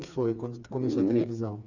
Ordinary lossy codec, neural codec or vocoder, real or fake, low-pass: none; codec, 16 kHz, 4 kbps, FreqCodec, smaller model; fake; 7.2 kHz